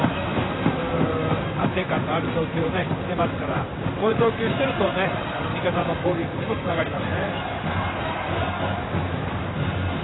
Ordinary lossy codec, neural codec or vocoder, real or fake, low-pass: AAC, 16 kbps; vocoder, 44.1 kHz, 128 mel bands, Pupu-Vocoder; fake; 7.2 kHz